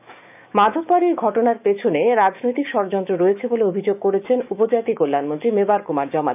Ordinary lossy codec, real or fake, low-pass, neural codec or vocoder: none; fake; 3.6 kHz; autoencoder, 48 kHz, 128 numbers a frame, DAC-VAE, trained on Japanese speech